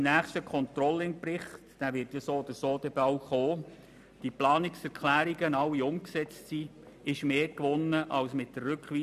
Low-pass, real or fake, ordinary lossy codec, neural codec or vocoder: 14.4 kHz; real; none; none